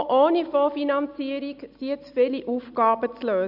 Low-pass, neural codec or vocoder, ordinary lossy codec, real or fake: 5.4 kHz; none; none; real